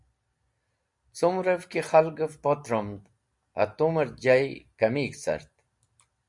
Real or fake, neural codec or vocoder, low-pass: real; none; 10.8 kHz